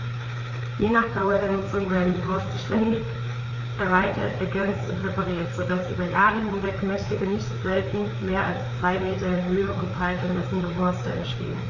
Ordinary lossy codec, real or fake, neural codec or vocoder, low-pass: Opus, 64 kbps; fake; codec, 16 kHz, 4 kbps, FreqCodec, larger model; 7.2 kHz